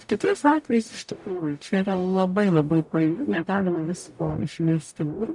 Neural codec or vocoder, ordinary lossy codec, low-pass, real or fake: codec, 44.1 kHz, 0.9 kbps, DAC; MP3, 96 kbps; 10.8 kHz; fake